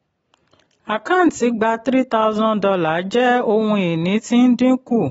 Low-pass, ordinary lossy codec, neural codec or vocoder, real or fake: 14.4 kHz; AAC, 24 kbps; none; real